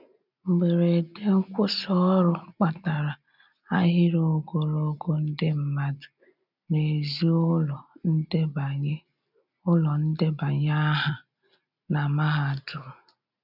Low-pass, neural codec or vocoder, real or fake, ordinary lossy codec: 5.4 kHz; none; real; none